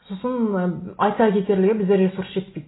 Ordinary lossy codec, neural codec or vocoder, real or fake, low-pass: AAC, 16 kbps; none; real; 7.2 kHz